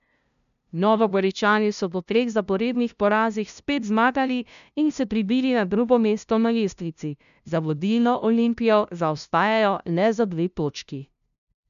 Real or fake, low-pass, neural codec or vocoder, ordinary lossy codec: fake; 7.2 kHz; codec, 16 kHz, 0.5 kbps, FunCodec, trained on LibriTTS, 25 frames a second; none